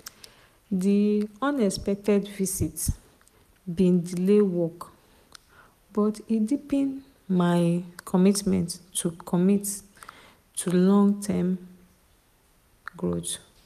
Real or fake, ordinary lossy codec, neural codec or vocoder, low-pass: real; none; none; 14.4 kHz